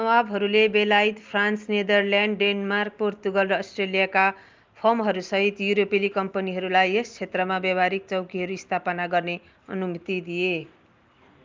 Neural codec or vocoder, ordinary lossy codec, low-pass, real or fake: none; Opus, 32 kbps; 7.2 kHz; real